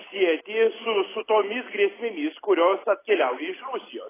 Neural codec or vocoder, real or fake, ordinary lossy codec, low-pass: none; real; AAC, 16 kbps; 3.6 kHz